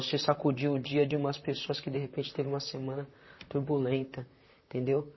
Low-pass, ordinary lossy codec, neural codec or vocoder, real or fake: 7.2 kHz; MP3, 24 kbps; vocoder, 44.1 kHz, 128 mel bands, Pupu-Vocoder; fake